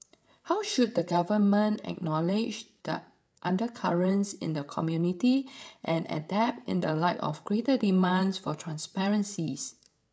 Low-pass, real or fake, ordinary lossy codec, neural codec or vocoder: none; fake; none; codec, 16 kHz, 8 kbps, FreqCodec, larger model